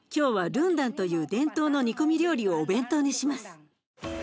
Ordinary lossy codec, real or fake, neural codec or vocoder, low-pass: none; real; none; none